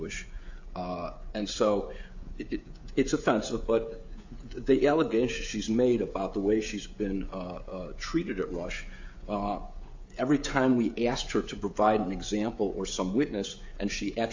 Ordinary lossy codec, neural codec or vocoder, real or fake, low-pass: AAC, 48 kbps; codec, 16 kHz, 16 kbps, FreqCodec, smaller model; fake; 7.2 kHz